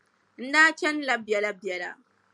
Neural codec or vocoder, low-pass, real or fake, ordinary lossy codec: none; 10.8 kHz; real; MP3, 96 kbps